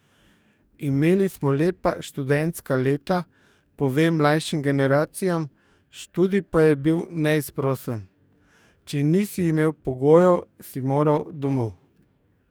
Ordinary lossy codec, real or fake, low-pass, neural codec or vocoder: none; fake; none; codec, 44.1 kHz, 2.6 kbps, DAC